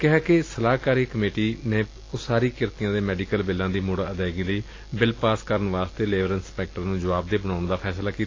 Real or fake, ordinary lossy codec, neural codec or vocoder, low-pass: real; AAC, 32 kbps; none; 7.2 kHz